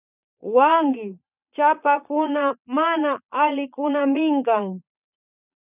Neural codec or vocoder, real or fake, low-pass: vocoder, 22.05 kHz, 80 mel bands, Vocos; fake; 3.6 kHz